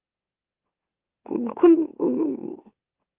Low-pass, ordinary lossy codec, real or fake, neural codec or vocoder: 3.6 kHz; Opus, 32 kbps; fake; autoencoder, 44.1 kHz, a latent of 192 numbers a frame, MeloTTS